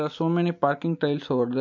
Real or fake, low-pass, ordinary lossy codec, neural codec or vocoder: real; 7.2 kHz; MP3, 48 kbps; none